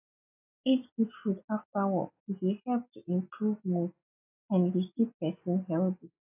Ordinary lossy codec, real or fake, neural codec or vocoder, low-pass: AAC, 32 kbps; real; none; 3.6 kHz